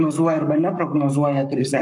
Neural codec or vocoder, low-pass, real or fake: codec, 44.1 kHz, 7.8 kbps, Pupu-Codec; 10.8 kHz; fake